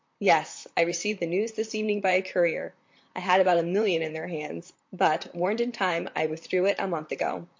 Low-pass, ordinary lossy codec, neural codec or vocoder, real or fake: 7.2 kHz; MP3, 48 kbps; vocoder, 44.1 kHz, 128 mel bands, Pupu-Vocoder; fake